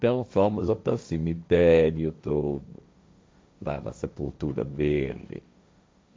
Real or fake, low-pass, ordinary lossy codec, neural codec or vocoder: fake; none; none; codec, 16 kHz, 1.1 kbps, Voila-Tokenizer